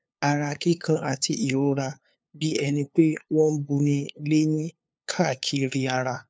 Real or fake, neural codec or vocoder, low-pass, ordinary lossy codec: fake; codec, 16 kHz, 2 kbps, FunCodec, trained on LibriTTS, 25 frames a second; none; none